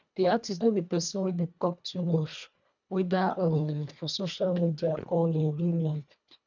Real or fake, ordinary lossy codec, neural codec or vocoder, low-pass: fake; none; codec, 24 kHz, 1.5 kbps, HILCodec; 7.2 kHz